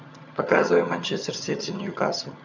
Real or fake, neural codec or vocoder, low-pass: fake; vocoder, 22.05 kHz, 80 mel bands, HiFi-GAN; 7.2 kHz